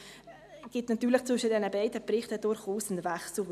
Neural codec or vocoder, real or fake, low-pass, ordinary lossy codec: none; real; 14.4 kHz; none